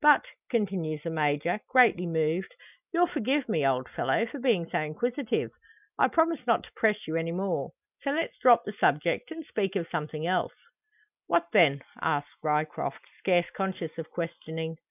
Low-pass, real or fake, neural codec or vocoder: 3.6 kHz; real; none